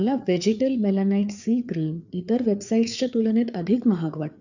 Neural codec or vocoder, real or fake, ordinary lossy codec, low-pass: codec, 16 kHz, 4 kbps, FreqCodec, larger model; fake; none; 7.2 kHz